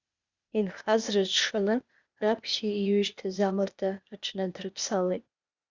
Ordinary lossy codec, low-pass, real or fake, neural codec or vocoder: Opus, 64 kbps; 7.2 kHz; fake; codec, 16 kHz, 0.8 kbps, ZipCodec